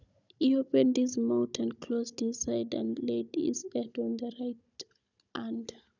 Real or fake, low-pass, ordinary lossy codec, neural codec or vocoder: fake; 7.2 kHz; none; codec, 16 kHz, 16 kbps, FunCodec, trained on Chinese and English, 50 frames a second